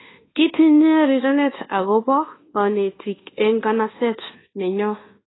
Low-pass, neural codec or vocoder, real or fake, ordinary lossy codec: 7.2 kHz; codec, 24 kHz, 1.2 kbps, DualCodec; fake; AAC, 16 kbps